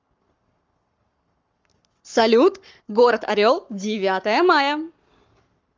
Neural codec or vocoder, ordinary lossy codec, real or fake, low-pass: codec, 44.1 kHz, 7.8 kbps, Pupu-Codec; Opus, 32 kbps; fake; 7.2 kHz